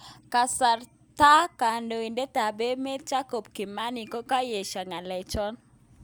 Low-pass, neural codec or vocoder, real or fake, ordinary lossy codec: none; none; real; none